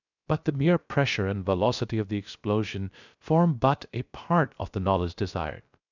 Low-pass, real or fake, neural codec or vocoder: 7.2 kHz; fake; codec, 16 kHz, 0.3 kbps, FocalCodec